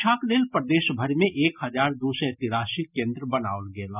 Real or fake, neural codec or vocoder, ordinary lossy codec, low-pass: real; none; none; 3.6 kHz